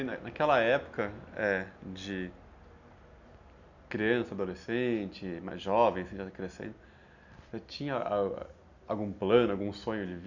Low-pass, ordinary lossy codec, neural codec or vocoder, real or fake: 7.2 kHz; none; none; real